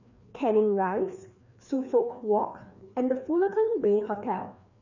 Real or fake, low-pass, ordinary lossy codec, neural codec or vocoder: fake; 7.2 kHz; none; codec, 16 kHz, 2 kbps, FreqCodec, larger model